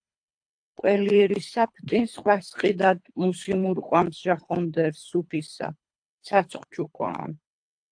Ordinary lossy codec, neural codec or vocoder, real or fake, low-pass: AAC, 64 kbps; codec, 24 kHz, 3 kbps, HILCodec; fake; 9.9 kHz